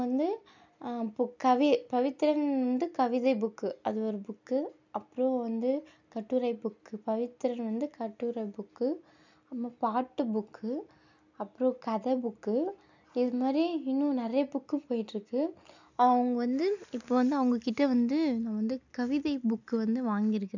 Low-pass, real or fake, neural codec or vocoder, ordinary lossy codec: 7.2 kHz; real; none; none